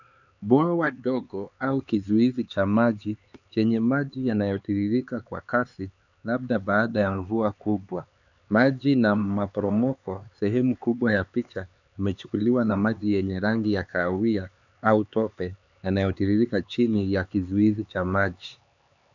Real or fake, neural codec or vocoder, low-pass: fake; codec, 16 kHz, 4 kbps, X-Codec, HuBERT features, trained on LibriSpeech; 7.2 kHz